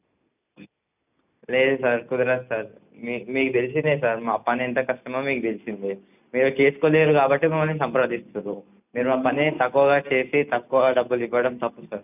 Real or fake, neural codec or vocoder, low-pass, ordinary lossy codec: real; none; 3.6 kHz; none